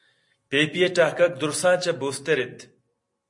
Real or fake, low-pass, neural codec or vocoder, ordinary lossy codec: real; 10.8 kHz; none; MP3, 48 kbps